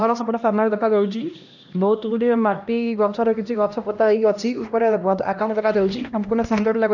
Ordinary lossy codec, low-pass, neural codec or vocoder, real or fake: none; 7.2 kHz; codec, 16 kHz, 1 kbps, X-Codec, HuBERT features, trained on LibriSpeech; fake